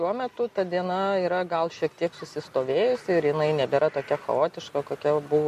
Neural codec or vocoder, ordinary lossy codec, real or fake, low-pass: none; MP3, 64 kbps; real; 14.4 kHz